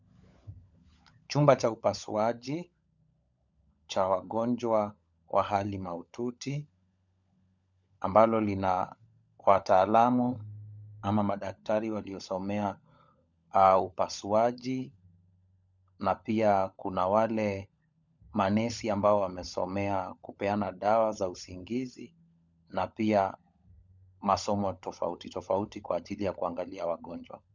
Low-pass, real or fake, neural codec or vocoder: 7.2 kHz; fake; codec, 16 kHz, 16 kbps, FunCodec, trained on LibriTTS, 50 frames a second